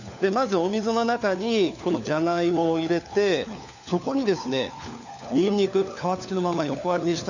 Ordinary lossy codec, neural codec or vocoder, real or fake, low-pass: none; codec, 16 kHz, 4 kbps, FunCodec, trained on LibriTTS, 50 frames a second; fake; 7.2 kHz